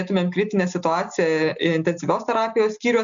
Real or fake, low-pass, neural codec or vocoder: real; 7.2 kHz; none